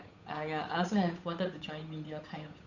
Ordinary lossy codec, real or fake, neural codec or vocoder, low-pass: none; fake; codec, 16 kHz, 8 kbps, FunCodec, trained on Chinese and English, 25 frames a second; 7.2 kHz